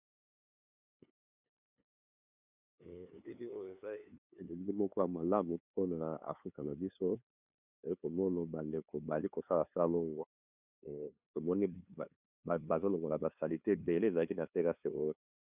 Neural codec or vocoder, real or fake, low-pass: codec, 16 kHz, 2 kbps, FunCodec, trained on LibriTTS, 25 frames a second; fake; 3.6 kHz